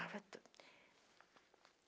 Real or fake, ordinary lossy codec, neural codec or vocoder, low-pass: real; none; none; none